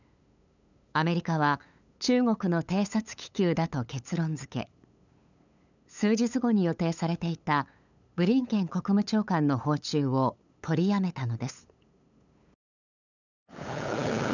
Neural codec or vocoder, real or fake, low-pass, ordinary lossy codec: codec, 16 kHz, 8 kbps, FunCodec, trained on LibriTTS, 25 frames a second; fake; 7.2 kHz; none